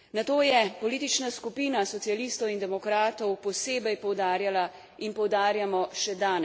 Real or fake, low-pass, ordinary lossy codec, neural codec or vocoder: real; none; none; none